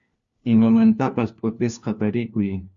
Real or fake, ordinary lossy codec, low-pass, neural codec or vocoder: fake; Opus, 64 kbps; 7.2 kHz; codec, 16 kHz, 1 kbps, FunCodec, trained on LibriTTS, 50 frames a second